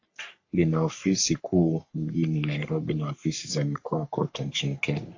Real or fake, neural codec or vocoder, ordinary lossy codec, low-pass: fake; codec, 44.1 kHz, 3.4 kbps, Pupu-Codec; AAC, 48 kbps; 7.2 kHz